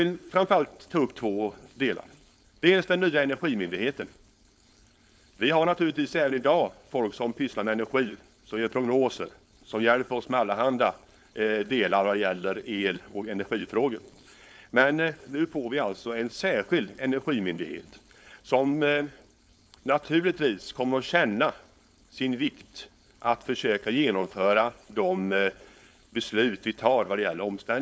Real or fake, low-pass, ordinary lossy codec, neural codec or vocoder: fake; none; none; codec, 16 kHz, 4.8 kbps, FACodec